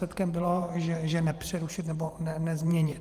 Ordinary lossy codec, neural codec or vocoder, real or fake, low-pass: Opus, 32 kbps; vocoder, 44.1 kHz, 128 mel bands every 512 samples, BigVGAN v2; fake; 14.4 kHz